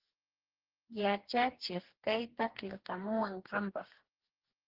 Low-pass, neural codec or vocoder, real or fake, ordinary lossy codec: 5.4 kHz; codec, 44.1 kHz, 2.6 kbps, DAC; fake; Opus, 16 kbps